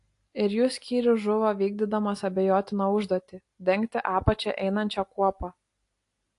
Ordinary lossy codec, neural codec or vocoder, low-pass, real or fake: AAC, 48 kbps; none; 10.8 kHz; real